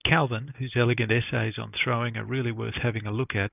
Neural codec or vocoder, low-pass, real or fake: none; 3.6 kHz; real